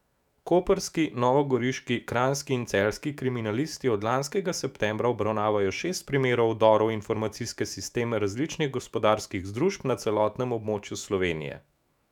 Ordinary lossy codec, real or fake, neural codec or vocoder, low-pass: none; fake; autoencoder, 48 kHz, 128 numbers a frame, DAC-VAE, trained on Japanese speech; 19.8 kHz